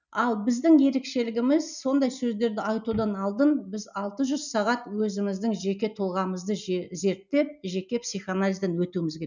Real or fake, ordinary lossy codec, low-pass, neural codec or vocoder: real; none; 7.2 kHz; none